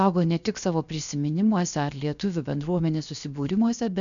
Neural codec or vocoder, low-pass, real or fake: codec, 16 kHz, 0.7 kbps, FocalCodec; 7.2 kHz; fake